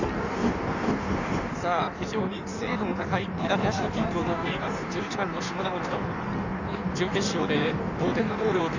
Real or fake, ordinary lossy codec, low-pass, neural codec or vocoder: fake; none; 7.2 kHz; codec, 16 kHz in and 24 kHz out, 1.1 kbps, FireRedTTS-2 codec